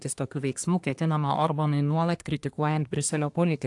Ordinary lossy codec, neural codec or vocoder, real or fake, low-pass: AAC, 64 kbps; codec, 24 kHz, 1 kbps, SNAC; fake; 10.8 kHz